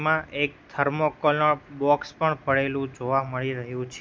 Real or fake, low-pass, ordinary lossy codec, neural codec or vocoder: real; none; none; none